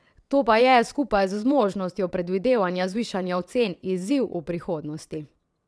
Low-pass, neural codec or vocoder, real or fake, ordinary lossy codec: none; vocoder, 22.05 kHz, 80 mel bands, WaveNeXt; fake; none